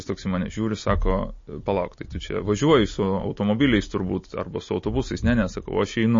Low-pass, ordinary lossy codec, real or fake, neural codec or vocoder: 7.2 kHz; MP3, 32 kbps; real; none